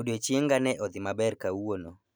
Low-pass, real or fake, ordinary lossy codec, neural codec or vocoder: none; real; none; none